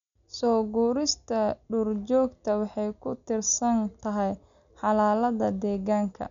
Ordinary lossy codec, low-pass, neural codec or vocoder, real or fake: none; 7.2 kHz; none; real